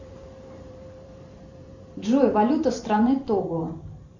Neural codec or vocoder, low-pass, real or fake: vocoder, 44.1 kHz, 128 mel bands every 512 samples, BigVGAN v2; 7.2 kHz; fake